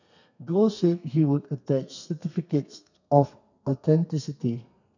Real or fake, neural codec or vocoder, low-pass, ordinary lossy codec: fake; codec, 32 kHz, 1.9 kbps, SNAC; 7.2 kHz; none